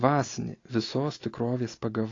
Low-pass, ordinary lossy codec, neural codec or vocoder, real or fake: 7.2 kHz; AAC, 32 kbps; none; real